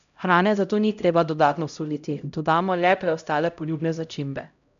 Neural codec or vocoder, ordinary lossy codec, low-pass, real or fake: codec, 16 kHz, 0.5 kbps, X-Codec, HuBERT features, trained on LibriSpeech; none; 7.2 kHz; fake